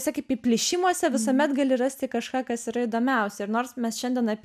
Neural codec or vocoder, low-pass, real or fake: none; 14.4 kHz; real